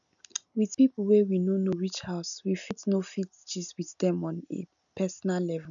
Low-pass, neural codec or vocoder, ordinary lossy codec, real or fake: 7.2 kHz; none; MP3, 96 kbps; real